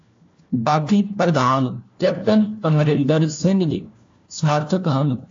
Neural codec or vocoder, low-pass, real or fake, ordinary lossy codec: codec, 16 kHz, 1 kbps, FunCodec, trained on LibriTTS, 50 frames a second; 7.2 kHz; fake; AAC, 48 kbps